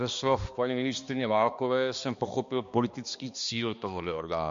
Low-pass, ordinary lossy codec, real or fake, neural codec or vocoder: 7.2 kHz; MP3, 48 kbps; fake; codec, 16 kHz, 2 kbps, X-Codec, HuBERT features, trained on balanced general audio